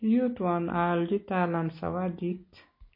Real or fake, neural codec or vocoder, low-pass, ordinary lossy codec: real; none; 5.4 kHz; MP3, 24 kbps